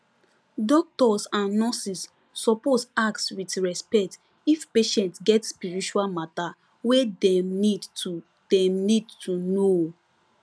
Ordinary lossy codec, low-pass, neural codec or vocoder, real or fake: none; none; none; real